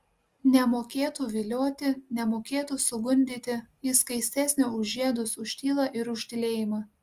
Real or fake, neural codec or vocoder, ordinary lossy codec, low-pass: real; none; Opus, 32 kbps; 14.4 kHz